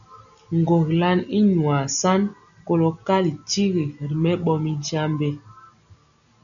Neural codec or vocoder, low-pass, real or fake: none; 7.2 kHz; real